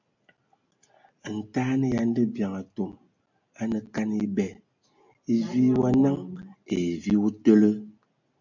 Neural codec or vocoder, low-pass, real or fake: none; 7.2 kHz; real